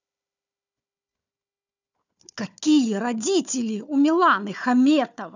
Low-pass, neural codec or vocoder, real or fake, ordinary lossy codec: 7.2 kHz; codec, 16 kHz, 4 kbps, FunCodec, trained on Chinese and English, 50 frames a second; fake; none